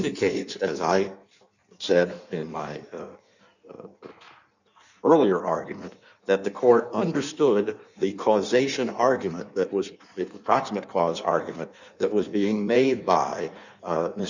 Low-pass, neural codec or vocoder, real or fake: 7.2 kHz; codec, 16 kHz in and 24 kHz out, 1.1 kbps, FireRedTTS-2 codec; fake